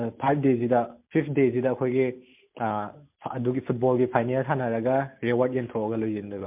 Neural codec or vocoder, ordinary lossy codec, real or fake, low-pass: none; MP3, 32 kbps; real; 3.6 kHz